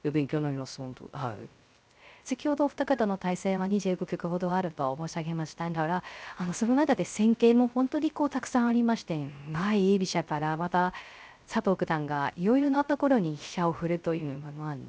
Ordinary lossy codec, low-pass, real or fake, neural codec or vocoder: none; none; fake; codec, 16 kHz, 0.3 kbps, FocalCodec